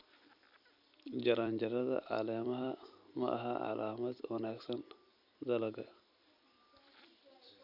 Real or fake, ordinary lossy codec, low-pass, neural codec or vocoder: fake; none; 5.4 kHz; vocoder, 44.1 kHz, 128 mel bands every 256 samples, BigVGAN v2